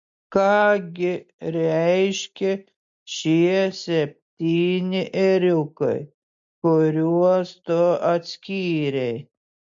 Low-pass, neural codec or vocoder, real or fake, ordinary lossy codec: 7.2 kHz; none; real; MP3, 48 kbps